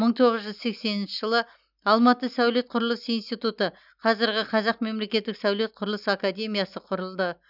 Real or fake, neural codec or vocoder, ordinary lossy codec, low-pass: real; none; none; 5.4 kHz